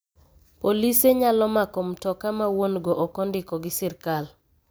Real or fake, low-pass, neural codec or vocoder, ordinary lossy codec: real; none; none; none